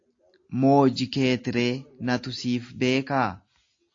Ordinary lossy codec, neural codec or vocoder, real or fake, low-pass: AAC, 48 kbps; none; real; 7.2 kHz